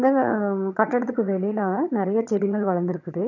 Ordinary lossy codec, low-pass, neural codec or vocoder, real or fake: none; 7.2 kHz; vocoder, 22.05 kHz, 80 mel bands, HiFi-GAN; fake